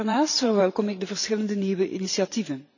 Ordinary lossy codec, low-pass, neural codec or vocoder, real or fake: none; 7.2 kHz; vocoder, 44.1 kHz, 128 mel bands every 512 samples, BigVGAN v2; fake